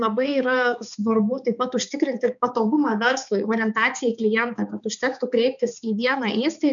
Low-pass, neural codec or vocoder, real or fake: 7.2 kHz; codec, 16 kHz, 4 kbps, X-Codec, HuBERT features, trained on balanced general audio; fake